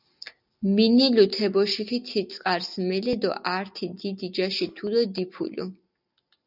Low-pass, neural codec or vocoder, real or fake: 5.4 kHz; none; real